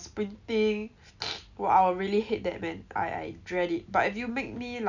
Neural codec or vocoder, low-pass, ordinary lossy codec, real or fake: none; 7.2 kHz; none; real